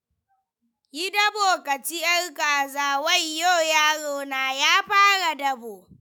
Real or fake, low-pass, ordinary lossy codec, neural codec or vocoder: fake; none; none; autoencoder, 48 kHz, 128 numbers a frame, DAC-VAE, trained on Japanese speech